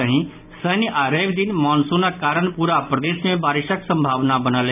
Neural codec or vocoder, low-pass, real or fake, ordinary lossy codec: none; 3.6 kHz; real; none